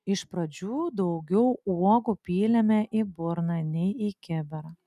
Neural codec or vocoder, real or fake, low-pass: none; real; 14.4 kHz